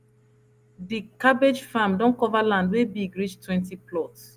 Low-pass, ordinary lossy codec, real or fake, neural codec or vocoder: 14.4 kHz; Opus, 32 kbps; real; none